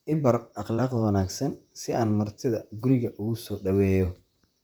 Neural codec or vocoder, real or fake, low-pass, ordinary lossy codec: vocoder, 44.1 kHz, 128 mel bands, Pupu-Vocoder; fake; none; none